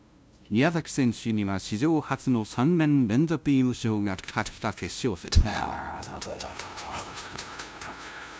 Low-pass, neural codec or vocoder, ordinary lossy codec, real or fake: none; codec, 16 kHz, 0.5 kbps, FunCodec, trained on LibriTTS, 25 frames a second; none; fake